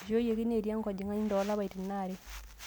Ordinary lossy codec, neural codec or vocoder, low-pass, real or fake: none; none; none; real